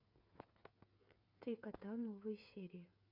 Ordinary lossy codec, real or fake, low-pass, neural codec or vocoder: MP3, 48 kbps; fake; 5.4 kHz; codec, 16 kHz, 8 kbps, FreqCodec, smaller model